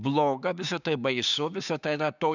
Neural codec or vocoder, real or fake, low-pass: codec, 16 kHz, 6 kbps, DAC; fake; 7.2 kHz